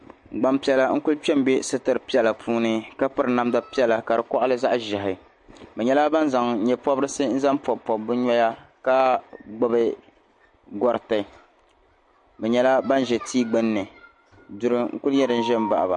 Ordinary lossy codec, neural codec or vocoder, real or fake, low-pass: MP3, 48 kbps; none; real; 10.8 kHz